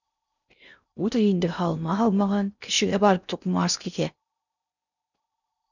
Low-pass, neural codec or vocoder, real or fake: 7.2 kHz; codec, 16 kHz in and 24 kHz out, 0.6 kbps, FocalCodec, streaming, 4096 codes; fake